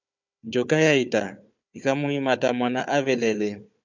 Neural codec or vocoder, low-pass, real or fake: codec, 16 kHz, 4 kbps, FunCodec, trained on Chinese and English, 50 frames a second; 7.2 kHz; fake